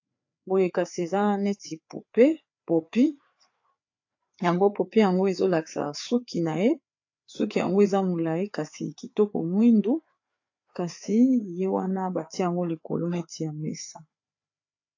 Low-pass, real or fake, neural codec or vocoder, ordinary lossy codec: 7.2 kHz; fake; codec, 16 kHz, 4 kbps, FreqCodec, larger model; AAC, 48 kbps